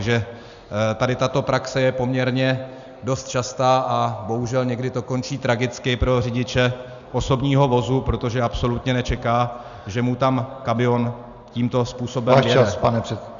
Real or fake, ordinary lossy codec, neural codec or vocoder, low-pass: real; Opus, 64 kbps; none; 7.2 kHz